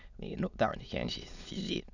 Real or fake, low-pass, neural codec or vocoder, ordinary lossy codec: fake; 7.2 kHz; autoencoder, 22.05 kHz, a latent of 192 numbers a frame, VITS, trained on many speakers; none